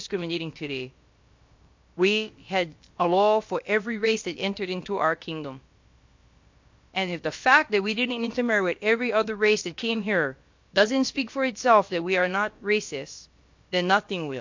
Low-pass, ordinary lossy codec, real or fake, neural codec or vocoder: 7.2 kHz; MP3, 48 kbps; fake; codec, 16 kHz, about 1 kbps, DyCAST, with the encoder's durations